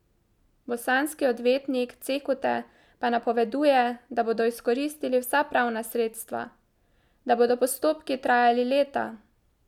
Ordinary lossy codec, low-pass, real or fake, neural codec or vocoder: none; 19.8 kHz; real; none